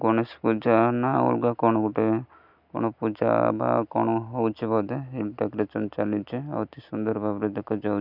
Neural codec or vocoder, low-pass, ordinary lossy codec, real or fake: none; 5.4 kHz; none; real